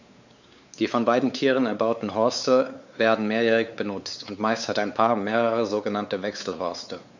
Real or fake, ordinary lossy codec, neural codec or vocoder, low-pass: fake; none; codec, 16 kHz, 4 kbps, X-Codec, WavLM features, trained on Multilingual LibriSpeech; 7.2 kHz